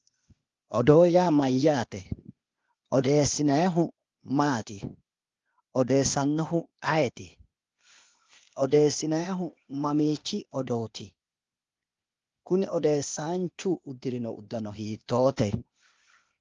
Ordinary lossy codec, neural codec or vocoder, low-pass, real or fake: Opus, 32 kbps; codec, 16 kHz, 0.8 kbps, ZipCodec; 7.2 kHz; fake